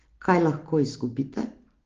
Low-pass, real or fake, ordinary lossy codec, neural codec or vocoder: 7.2 kHz; real; Opus, 16 kbps; none